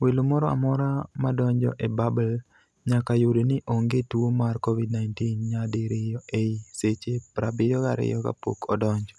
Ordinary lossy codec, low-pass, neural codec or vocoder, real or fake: none; none; none; real